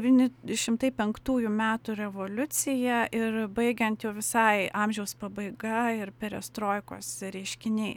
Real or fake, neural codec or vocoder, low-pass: real; none; 19.8 kHz